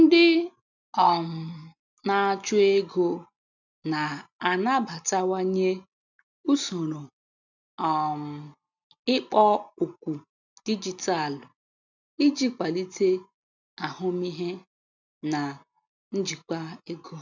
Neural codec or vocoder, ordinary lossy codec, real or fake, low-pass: none; none; real; 7.2 kHz